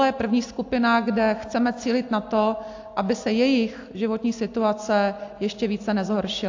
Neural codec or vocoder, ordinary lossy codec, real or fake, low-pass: none; AAC, 48 kbps; real; 7.2 kHz